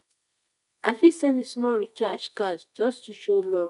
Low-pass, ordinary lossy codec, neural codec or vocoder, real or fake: 10.8 kHz; none; codec, 24 kHz, 0.9 kbps, WavTokenizer, medium music audio release; fake